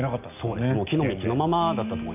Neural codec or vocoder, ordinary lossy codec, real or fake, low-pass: none; none; real; 3.6 kHz